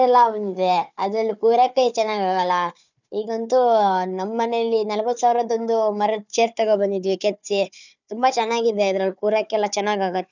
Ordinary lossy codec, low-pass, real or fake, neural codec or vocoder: none; 7.2 kHz; fake; codec, 16 kHz, 4 kbps, FunCodec, trained on Chinese and English, 50 frames a second